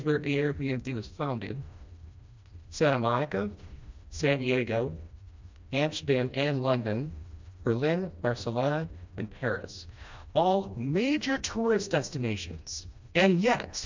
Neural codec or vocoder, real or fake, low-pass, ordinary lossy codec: codec, 16 kHz, 1 kbps, FreqCodec, smaller model; fake; 7.2 kHz; MP3, 64 kbps